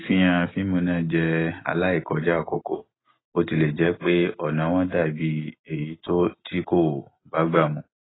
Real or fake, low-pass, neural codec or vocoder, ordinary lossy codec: real; 7.2 kHz; none; AAC, 16 kbps